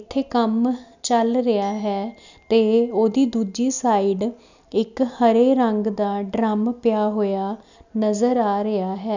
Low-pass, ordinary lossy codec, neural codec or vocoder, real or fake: 7.2 kHz; none; none; real